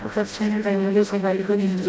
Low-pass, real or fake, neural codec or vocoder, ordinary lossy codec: none; fake; codec, 16 kHz, 0.5 kbps, FreqCodec, smaller model; none